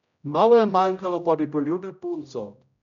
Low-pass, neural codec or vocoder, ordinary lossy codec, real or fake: 7.2 kHz; codec, 16 kHz, 0.5 kbps, X-Codec, HuBERT features, trained on general audio; none; fake